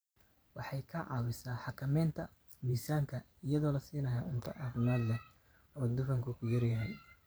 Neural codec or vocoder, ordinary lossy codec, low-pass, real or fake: vocoder, 44.1 kHz, 128 mel bands every 512 samples, BigVGAN v2; none; none; fake